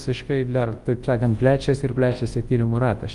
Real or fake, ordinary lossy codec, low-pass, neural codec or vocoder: fake; Opus, 24 kbps; 10.8 kHz; codec, 24 kHz, 0.9 kbps, WavTokenizer, large speech release